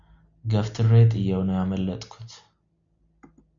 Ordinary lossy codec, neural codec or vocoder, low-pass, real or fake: AAC, 64 kbps; none; 7.2 kHz; real